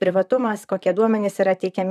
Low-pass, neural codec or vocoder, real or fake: 14.4 kHz; vocoder, 44.1 kHz, 128 mel bands, Pupu-Vocoder; fake